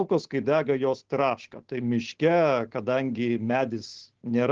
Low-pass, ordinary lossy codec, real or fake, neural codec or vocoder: 7.2 kHz; Opus, 16 kbps; real; none